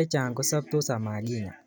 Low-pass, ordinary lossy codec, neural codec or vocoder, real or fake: none; none; none; real